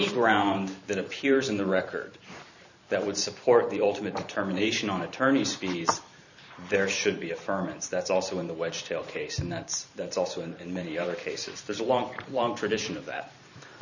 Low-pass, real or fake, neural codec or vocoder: 7.2 kHz; fake; vocoder, 44.1 kHz, 128 mel bands every 512 samples, BigVGAN v2